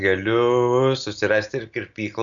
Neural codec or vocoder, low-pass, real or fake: none; 7.2 kHz; real